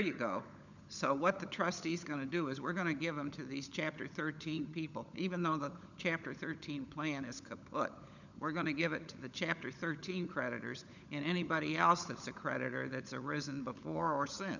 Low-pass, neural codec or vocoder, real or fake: 7.2 kHz; codec, 16 kHz, 16 kbps, FunCodec, trained on LibriTTS, 50 frames a second; fake